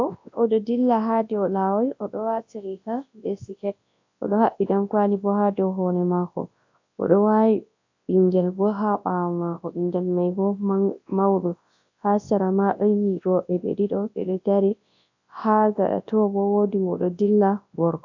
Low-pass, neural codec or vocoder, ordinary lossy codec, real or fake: 7.2 kHz; codec, 24 kHz, 0.9 kbps, WavTokenizer, large speech release; AAC, 48 kbps; fake